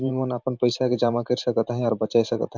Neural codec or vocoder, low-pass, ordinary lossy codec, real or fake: vocoder, 44.1 kHz, 128 mel bands every 512 samples, BigVGAN v2; 7.2 kHz; MP3, 64 kbps; fake